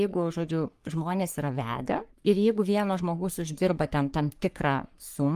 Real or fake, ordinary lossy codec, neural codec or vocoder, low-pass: fake; Opus, 32 kbps; codec, 44.1 kHz, 3.4 kbps, Pupu-Codec; 14.4 kHz